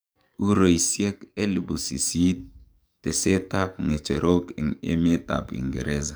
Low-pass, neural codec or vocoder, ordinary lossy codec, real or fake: none; codec, 44.1 kHz, 7.8 kbps, DAC; none; fake